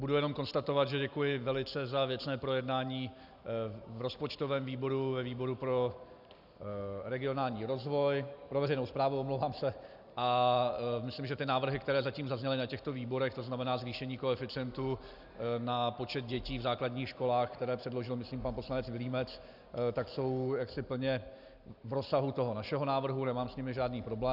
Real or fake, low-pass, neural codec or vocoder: real; 5.4 kHz; none